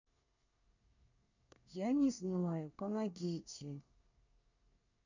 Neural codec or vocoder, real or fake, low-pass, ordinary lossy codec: codec, 24 kHz, 1 kbps, SNAC; fake; 7.2 kHz; none